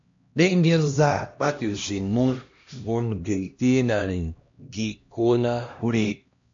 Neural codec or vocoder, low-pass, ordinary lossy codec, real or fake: codec, 16 kHz, 1 kbps, X-Codec, HuBERT features, trained on LibriSpeech; 7.2 kHz; MP3, 48 kbps; fake